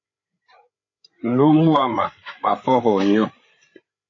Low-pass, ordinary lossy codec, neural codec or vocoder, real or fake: 7.2 kHz; AAC, 32 kbps; codec, 16 kHz, 8 kbps, FreqCodec, larger model; fake